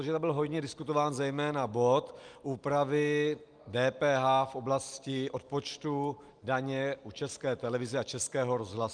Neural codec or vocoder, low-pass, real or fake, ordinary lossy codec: none; 9.9 kHz; real; Opus, 32 kbps